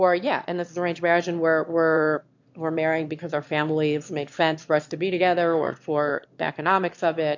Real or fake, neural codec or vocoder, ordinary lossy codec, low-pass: fake; autoencoder, 22.05 kHz, a latent of 192 numbers a frame, VITS, trained on one speaker; MP3, 48 kbps; 7.2 kHz